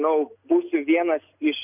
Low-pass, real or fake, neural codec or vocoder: 3.6 kHz; real; none